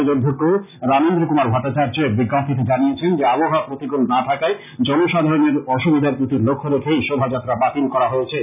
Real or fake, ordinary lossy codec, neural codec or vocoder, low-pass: real; none; none; 3.6 kHz